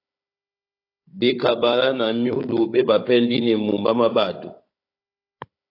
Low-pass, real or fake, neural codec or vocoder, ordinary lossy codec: 5.4 kHz; fake; codec, 16 kHz, 16 kbps, FunCodec, trained on Chinese and English, 50 frames a second; AAC, 32 kbps